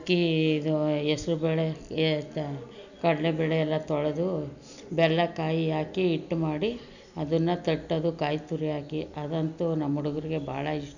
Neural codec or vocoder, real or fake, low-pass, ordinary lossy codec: none; real; 7.2 kHz; none